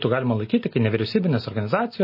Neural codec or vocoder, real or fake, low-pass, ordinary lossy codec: none; real; 5.4 kHz; MP3, 24 kbps